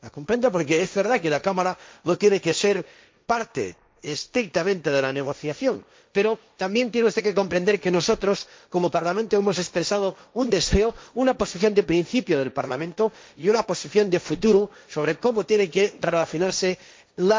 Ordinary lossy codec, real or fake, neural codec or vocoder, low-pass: none; fake; codec, 16 kHz, 1.1 kbps, Voila-Tokenizer; none